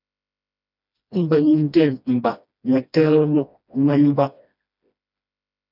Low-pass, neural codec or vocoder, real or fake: 5.4 kHz; codec, 16 kHz, 1 kbps, FreqCodec, smaller model; fake